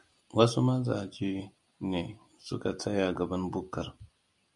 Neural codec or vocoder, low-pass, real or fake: none; 10.8 kHz; real